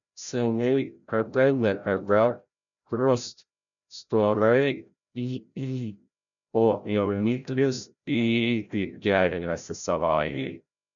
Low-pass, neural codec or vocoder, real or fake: 7.2 kHz; codec, 16 kHz, 0.5 kbps, FreqCodec, larger model; fake